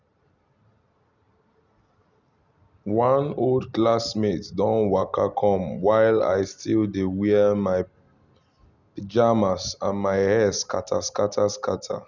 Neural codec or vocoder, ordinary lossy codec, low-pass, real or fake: none; none; 7.2 kHz; real